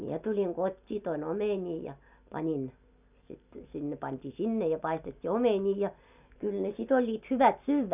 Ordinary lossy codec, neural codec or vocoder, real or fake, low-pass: none; none; real; 3.6 kHz